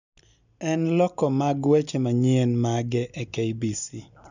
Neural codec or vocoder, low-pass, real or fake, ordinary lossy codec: none; 7.2 kHz; real; none